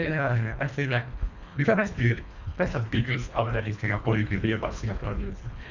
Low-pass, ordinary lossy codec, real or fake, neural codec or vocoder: 7.2 kHz; none; fake; codec, 24 kHz, 1.5 kbps, HILCodec